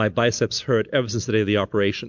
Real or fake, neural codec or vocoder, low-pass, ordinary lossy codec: real; none; 7.2 kHz; MP3, 64 kbps